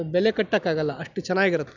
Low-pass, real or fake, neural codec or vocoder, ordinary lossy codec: 7.2 kHz; real; none; none